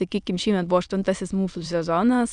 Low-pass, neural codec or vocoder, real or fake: 9.9 kHz; autoencoder, 22.05 kHz, a latent of 192 numbers a frame, VITS, trained on many speakers; fake